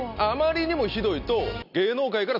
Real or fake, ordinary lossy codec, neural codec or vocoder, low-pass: real; none; none; 5.4 kHz